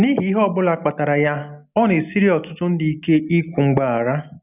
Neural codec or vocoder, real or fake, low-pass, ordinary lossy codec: none; real; 3.6 kHz; none